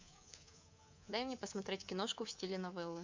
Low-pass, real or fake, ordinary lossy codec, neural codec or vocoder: 7.2 kHz; fake; MP3, 64 kbps; codec, 24 kHz, 3.1 kbps, DualCodec